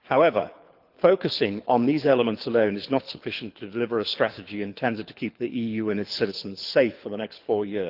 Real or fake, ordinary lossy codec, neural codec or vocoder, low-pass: fake; Opus, 32 kbps; codec, 24 kHz, 6 kbps, HILCodec; 5.4 kHz